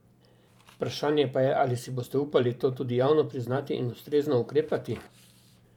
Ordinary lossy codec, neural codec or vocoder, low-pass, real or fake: none; vocoder, 44.1 kHz, 128 mel bands every 512 samples, BigVGAN v2; 19.8 kHz; fake